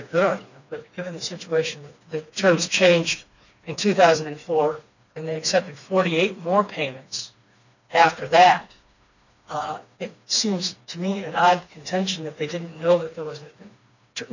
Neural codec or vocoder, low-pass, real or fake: codec, 16 kHz, 2 kbps, FreqCodec, smaller model; 7.2 kHz; fake